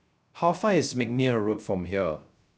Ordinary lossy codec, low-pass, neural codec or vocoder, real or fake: none; none; codec, 16 kHz, 0.3 kbps, FocalCodec; fake